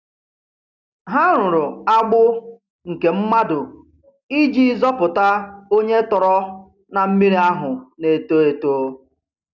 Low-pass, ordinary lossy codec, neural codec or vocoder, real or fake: 7.2 kHz; none; none; real